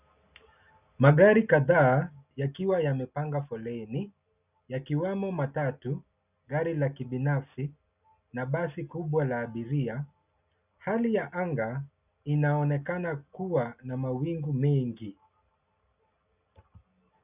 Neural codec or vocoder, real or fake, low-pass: none; real; 3.6 kHz